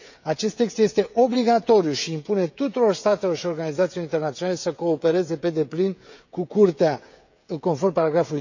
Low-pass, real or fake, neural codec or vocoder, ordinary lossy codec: 7.2 kHz; fake; codec, 16 kHz, 16 kbps, FreqCodec, smaller model; none